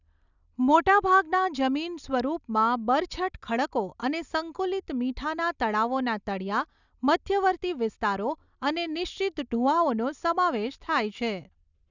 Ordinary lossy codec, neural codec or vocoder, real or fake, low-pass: none; none; real; 7.2 kHz